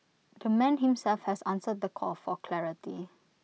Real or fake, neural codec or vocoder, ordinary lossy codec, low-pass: real; none; none; none